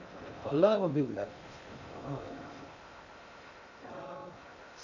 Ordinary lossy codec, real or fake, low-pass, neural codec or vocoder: AAC, 48 kbps; fake; 7.2 kHz; codec, 16 kHz in and 24 kHz out, 0.6 kbps, FocalCodec, streaming, 2048 codes